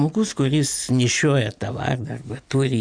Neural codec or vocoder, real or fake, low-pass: none; real; 9.9 kHz